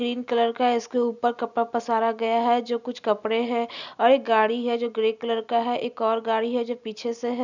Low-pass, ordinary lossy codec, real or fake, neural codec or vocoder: 7.2 kHz; none; real; none